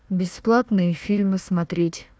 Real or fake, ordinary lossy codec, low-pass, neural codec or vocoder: fake; none; none; codec, 16 kHz, 1 kbps, FunCodec, trained on Chinese and English, 50 frames a second